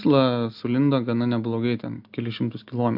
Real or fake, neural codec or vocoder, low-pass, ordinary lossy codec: real; none; 5.4 kHz; AAC, 48 kbps